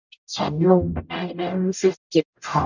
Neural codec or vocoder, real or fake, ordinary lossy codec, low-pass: codec, 44.1 kHz, 0.9 kbps, DAC; fake; none; 7.2 kHz